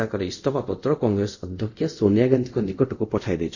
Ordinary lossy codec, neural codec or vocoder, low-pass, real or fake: none; codec, 24 kHz, 0.5 kbps, DualCodec; 7.2 kHz; fake